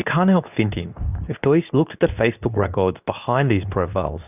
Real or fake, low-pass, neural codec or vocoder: fake; 3.6 kHz; codec, 24 kHz, 0.9 kbps, WavTokenizer, medium speech release version 2